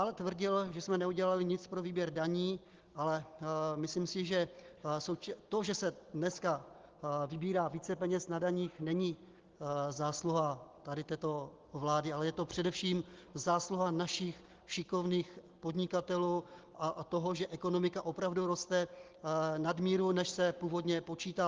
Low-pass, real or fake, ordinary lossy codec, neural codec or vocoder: 7.2 kHz; real; Opus, 16 kbps; none